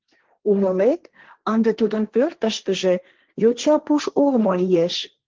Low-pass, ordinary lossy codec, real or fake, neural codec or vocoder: 7.2 kHz; Opus, 16 kbps; fake; codec, 16 kHz, 1.1 kbps, Voila-Tokenizer